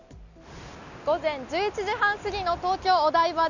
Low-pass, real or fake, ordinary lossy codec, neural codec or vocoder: 7.2 kHz; real; none; none